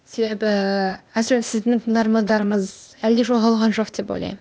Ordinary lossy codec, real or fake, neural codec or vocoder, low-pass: none; fake; codec, 16 kHz, 0.8 kbps, ZipCodec; none